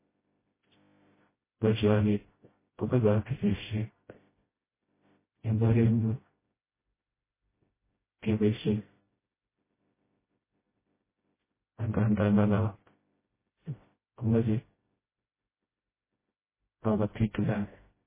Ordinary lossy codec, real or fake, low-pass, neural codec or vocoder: MP3, 16 kbps; fake; 3.6 kHz; codec, 16 kHz, 0.5 kbps, FreqCodec, smaller model